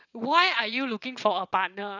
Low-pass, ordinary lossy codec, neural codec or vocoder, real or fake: 7.2 kHz; none; vocoder, 22.05 kHz, 80 mel bands, WaveNeXt; fake